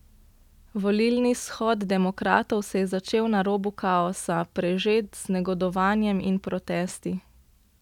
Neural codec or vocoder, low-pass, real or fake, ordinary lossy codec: none; 19.8 kHz; real; none